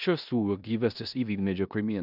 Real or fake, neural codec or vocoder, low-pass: fake; codec, 16 kHz in and 24 kHz out, 0.9 kbps, LongCat-Audio-Codec, four codebook decoder; 5.4 kHz